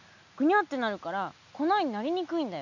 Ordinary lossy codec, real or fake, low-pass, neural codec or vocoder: none; real; 7.2 kHz; none